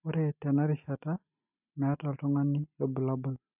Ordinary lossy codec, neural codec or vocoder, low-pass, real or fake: none; none; 3.6 kHz; real